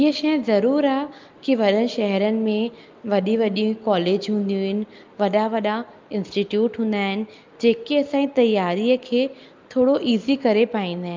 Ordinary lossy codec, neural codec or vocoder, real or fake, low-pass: Opus, 24 kbps; none; real; 7.2 kHz